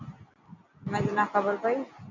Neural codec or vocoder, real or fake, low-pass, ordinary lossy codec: none; real; 7.2 kHz; MP3, 64 kbps